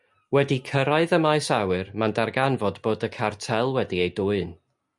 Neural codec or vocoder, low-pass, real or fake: none; 10.8 kHz; real